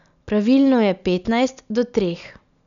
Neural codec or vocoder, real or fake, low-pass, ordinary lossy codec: none; real; 7.2 kHz; none